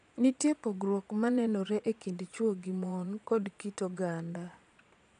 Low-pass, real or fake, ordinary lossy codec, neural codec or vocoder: 9.9 kHz; fake; none; vocoder, 22.05 kHz, 80 mel bands, Vocos